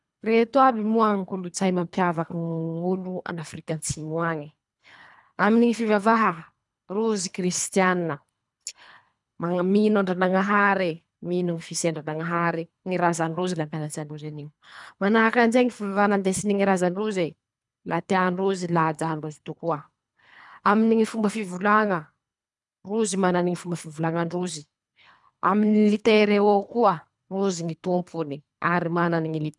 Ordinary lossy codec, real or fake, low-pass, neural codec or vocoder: none; fake; 10.8 kHz; codec, 24 kHz, 3 kbps, HILCodec